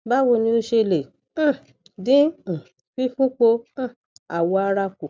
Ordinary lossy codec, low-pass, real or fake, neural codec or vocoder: none; none; real; none